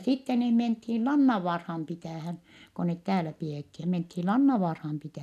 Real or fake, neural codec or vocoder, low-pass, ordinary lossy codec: real; none; 14.4 kHz; none